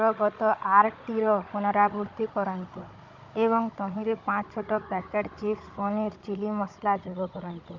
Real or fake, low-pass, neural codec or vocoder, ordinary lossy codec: fake; 7.2 kHz; codec, 16 kHz, 16 kbps, FunCodec, trained on Chinese and English, 50 frames a second; Opus, 24 kbps